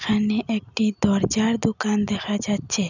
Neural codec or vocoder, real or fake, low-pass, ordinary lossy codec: none; real; 7.2 kHz; none